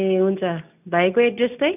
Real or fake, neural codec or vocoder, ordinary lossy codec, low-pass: real; none; none; 3.6 kHz